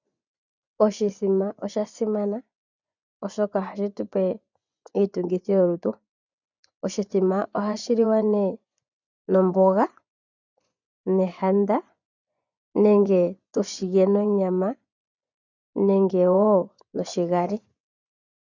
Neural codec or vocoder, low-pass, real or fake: vocoder, 24 kHz, 100 mel bands, Vocos; 7.2 kHz; fake